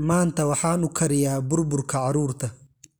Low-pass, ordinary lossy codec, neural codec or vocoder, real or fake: none; none; none; real